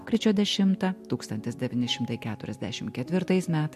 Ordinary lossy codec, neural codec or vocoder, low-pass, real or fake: MP3, 64 kbps; none; 14.4 kHz; real